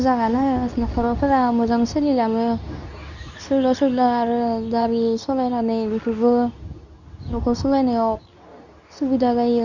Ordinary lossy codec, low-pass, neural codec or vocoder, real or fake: none; 7.2 kHz; codec, 24 kHz, 0.9 kbps, WavTokenizer, medium speech release version 2; fake